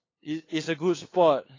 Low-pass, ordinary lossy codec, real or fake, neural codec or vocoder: 7.2 kHz; AAC, 32 kbps; fake; codec, 16 kHz, 8 kbps, FunCodec, trained on LibriTTS, 25 frames a second